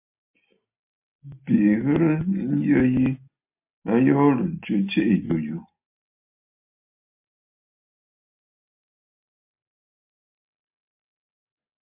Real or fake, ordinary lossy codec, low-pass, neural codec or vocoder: real; MP3, 24 kbps; 3.6 kHz; none